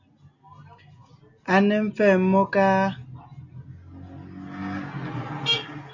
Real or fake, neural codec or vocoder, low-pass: real; none; 7.2 kHz